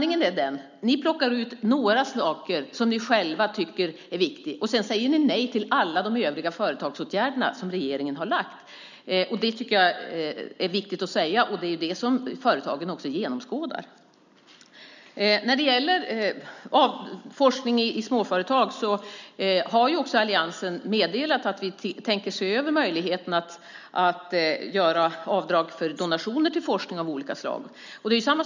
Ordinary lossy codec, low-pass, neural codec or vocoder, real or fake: none; 7.2 kHz; none; real